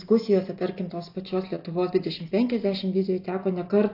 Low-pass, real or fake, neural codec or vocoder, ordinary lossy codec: 5.4 kHz; real; none; AAC, 32 kbps